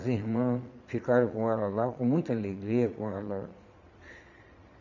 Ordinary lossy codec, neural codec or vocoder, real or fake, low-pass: none; vocoder, 22.05 kHz, 80 mel bands, Vocos; fake; 7.2 kHz